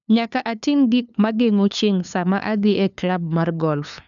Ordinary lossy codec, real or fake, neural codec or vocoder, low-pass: none; fake; codec, 16 kHz, 2 kbps, FunCodec, trained on LibriTTS, 25 frames a second; 7.2 kHz